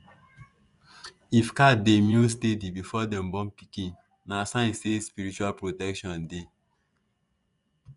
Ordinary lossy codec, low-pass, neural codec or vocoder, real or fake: none; 10.8 kHz; vocoder, 24 kHz, 100 mel bands, Vocos; fake